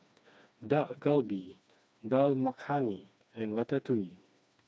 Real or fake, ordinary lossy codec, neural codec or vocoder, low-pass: fake; none; codec, 16 kHz, 2 kbps, FreqCodec, smaller model; none